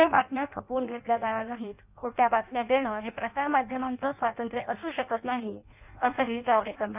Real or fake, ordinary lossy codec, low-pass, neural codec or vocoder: fake; none; 3.6 kHz; codec, 16 kHz in and 24 kHz out, 0.6 kbps, FireRedTTS-2 codec